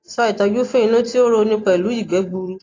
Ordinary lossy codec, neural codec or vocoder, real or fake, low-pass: AAC, 32 kbps; none; real; 7.2 kHz